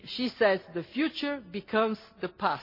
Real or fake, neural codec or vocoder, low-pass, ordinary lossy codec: real; none; 5.4 kHz; MP3, 32 kbps